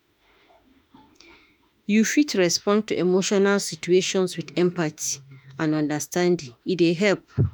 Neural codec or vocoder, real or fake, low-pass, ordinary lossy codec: autoencoder, 48 kHz, 32 numbers a frame, DAC-VAE, trained on Japanese speech; fake; none; none